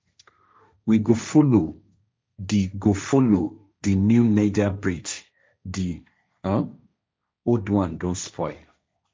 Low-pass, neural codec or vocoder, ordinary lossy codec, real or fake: none; codec, 16 kHz, 1.1 kbps, Voila-Tokenizer; none; fake